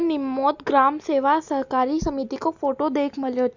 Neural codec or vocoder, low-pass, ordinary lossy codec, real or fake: none; 7.2 kHz; none; real